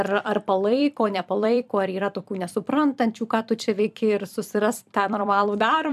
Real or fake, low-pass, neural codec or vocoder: fake; 14.4 kHz; vocoder, 44.1 kHz, 128 mel bands every 256 samples, BigVGAN v2